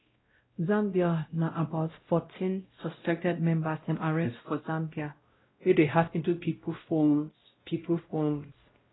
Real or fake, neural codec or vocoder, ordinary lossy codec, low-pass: fake; codec, 16 kHz, 0.5 kbps, X-Codec, WavLM features, trained on Multilingual LibriSpeech; AAC, 16 kbps; 7.2 kHz